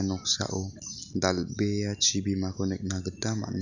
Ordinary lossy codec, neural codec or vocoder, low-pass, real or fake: none; none; 7.2 kHz; real